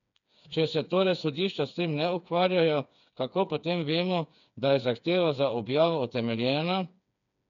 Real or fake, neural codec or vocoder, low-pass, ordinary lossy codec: fake; codec, 16 kHz, 4 kbps, FreqCodec, smaller model; 7.2 kHz; none